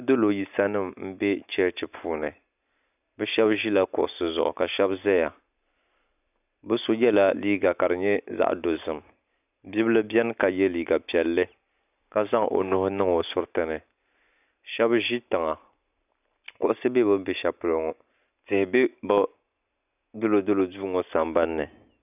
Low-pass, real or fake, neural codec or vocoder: 3.6 kHz; real; none